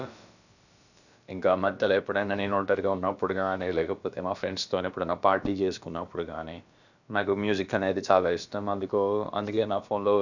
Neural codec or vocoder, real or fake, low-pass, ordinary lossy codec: codec, 16 kHz, about 1 kbps, DyCAST, with the encoder's durations; fake; 7.2 kHz; none